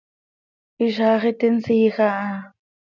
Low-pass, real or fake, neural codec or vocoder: 7.2 kHz; real; none